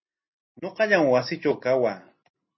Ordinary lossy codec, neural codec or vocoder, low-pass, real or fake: MP3, 24 kbps; none; 7.2 kHz; real